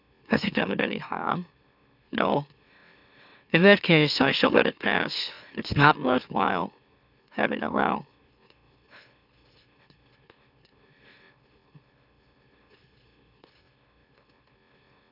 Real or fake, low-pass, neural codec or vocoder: fake; 5.4 kHz; autoencoder, 44.1 kHz, a latent of 192 numbers a frame, MeloTTS